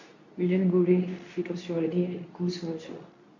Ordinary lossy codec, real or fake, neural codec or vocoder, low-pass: none; fake; codec, 24 kHz, 0.9 kbps, WavTokenizer, medium speech release version 2; 7.2 kHz